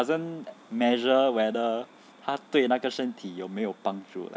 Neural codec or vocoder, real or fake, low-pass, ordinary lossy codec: none; real; none; none